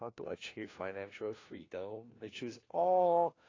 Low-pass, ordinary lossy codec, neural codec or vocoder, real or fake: 7.2 kHz; AAC, 32 kbps; codec, 16 kHz, 1 kbps, FunCodec, trained on LibriTTS, 50 frames a second; fake